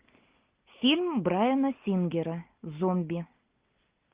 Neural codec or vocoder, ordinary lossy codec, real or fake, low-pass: none; Opus, 32 kbps; real; 3.6 kHz